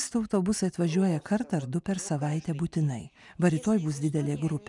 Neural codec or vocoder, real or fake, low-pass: none; real; 10.8 kHz